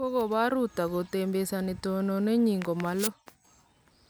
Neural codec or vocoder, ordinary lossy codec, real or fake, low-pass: none; none; real; none